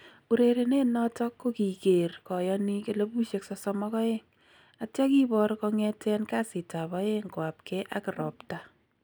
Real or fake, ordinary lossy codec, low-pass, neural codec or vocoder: real; none; none; none